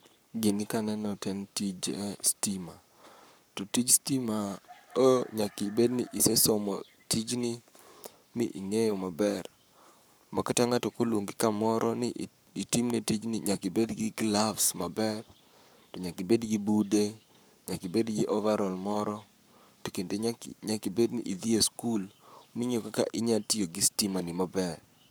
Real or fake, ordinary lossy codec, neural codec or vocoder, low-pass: fake; none; codec, 44.1 kHz, 7.8 kbps, Pupu-Codec; none